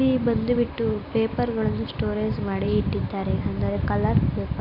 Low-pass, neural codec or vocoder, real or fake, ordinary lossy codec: 5.4 kHz; none; real; none